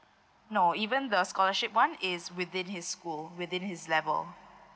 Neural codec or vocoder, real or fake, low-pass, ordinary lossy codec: none; real; none; none